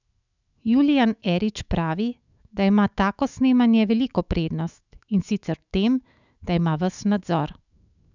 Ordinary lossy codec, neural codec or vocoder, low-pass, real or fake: none; autoencoder, 48 kHz, 128 numbers a frame, DAC-VAE, trained on Japanese speech; 7.2 kHz; fake